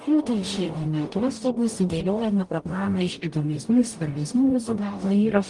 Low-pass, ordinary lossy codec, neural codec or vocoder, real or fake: 10.8 kHz; Opus, 24 kbps; codec, 44.1 kHz, 0.9 kbps, DAC; fake